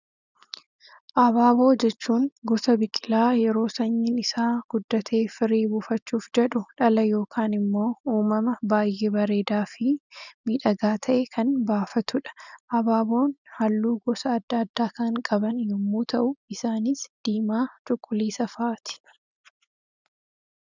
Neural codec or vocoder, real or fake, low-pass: none; real; 7.2 kHz